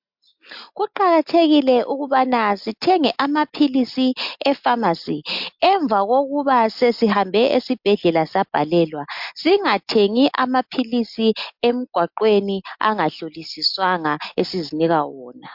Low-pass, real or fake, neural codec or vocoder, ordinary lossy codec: 5.4 kHz; real; none; MP3, 48 kbps